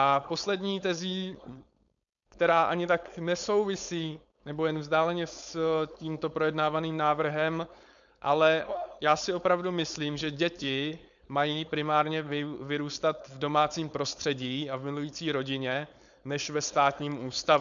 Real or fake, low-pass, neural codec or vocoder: fake; 7.2 kHz; codec, 16 kHz, 4.8 kbps, FACodec